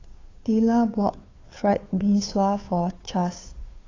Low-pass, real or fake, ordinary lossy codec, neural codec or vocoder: 7.2 kHz; fake; AAC, 32 kbps; codec, 16 kHz, 2 kbps, FunCodec, trained on Chinese and English, 25 frames a second